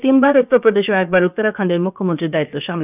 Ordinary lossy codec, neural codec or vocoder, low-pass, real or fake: none; codec, 16 kHz, about 1 kbps, DyCAST, with the encoder's durations; 3.6 kHz; fake